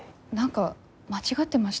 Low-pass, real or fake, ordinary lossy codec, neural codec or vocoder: none; real; none; none